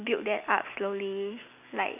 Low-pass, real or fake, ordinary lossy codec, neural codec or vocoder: 3.6 kHz; real; none; none